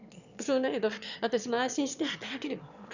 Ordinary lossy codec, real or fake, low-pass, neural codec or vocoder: none; fake; 7.2 kHz; autoencoder, 22.05 kHz, a latent of 192 numbers a frame, VITS, trained on one speaker